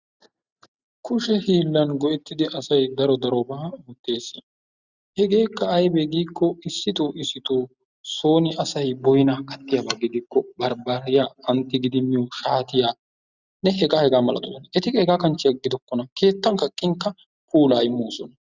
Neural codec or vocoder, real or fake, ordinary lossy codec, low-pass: none; real; Opus, 64 kbps; 7.2 kHz